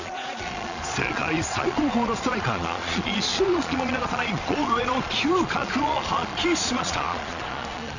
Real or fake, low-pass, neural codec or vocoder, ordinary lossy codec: fake; 7.2 kHz; vocoder, 22.05 kHz, 80 mel bands, Vocos; none